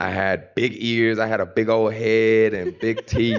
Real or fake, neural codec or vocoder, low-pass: real; none; 7.2 kHz